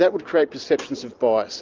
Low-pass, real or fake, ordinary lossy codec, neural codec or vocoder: 7.2 kHz; real; Opus, 24 kbps; none